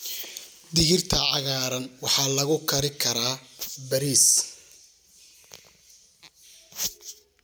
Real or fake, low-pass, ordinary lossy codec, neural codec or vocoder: real; none; none; none